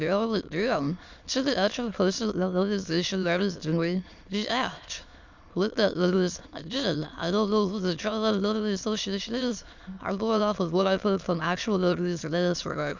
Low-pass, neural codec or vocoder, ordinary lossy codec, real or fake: 7.2 kHz; autoencoder, 22.05 kHz, a latent of 192 numbers a frame, VITS, trained on many speakers; Opus, 64 kbps; fake